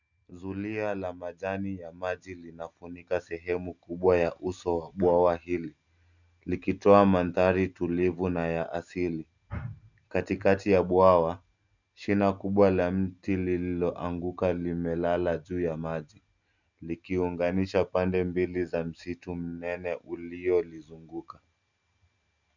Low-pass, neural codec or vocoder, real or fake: 7.2 kHz; none; real